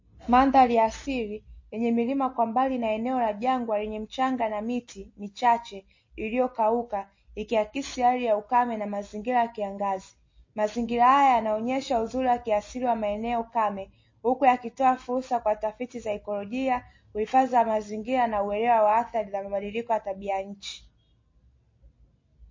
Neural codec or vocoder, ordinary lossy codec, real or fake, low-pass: none; MP3, 32 kbps; real; 7.2 kHz